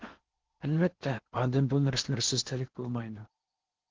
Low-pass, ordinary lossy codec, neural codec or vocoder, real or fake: 7.2 kHz; Opus, 16 kbps; codec, 16 kHz in and 24 kHz out, 0.8 kbps, FocalCodec, streaming, 65536 codes; fake